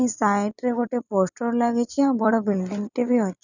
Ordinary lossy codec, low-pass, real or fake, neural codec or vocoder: none; 7.2 kHz; fake; vocoder, 44.1 kHz, 128 mel bands every 256 samples, BigVGAN v2